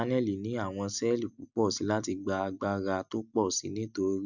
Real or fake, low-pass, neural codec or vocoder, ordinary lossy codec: real; 7.2 kHz; none; none